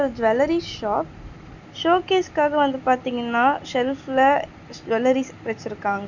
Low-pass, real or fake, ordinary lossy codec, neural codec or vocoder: 7.2 kHz; real; none; none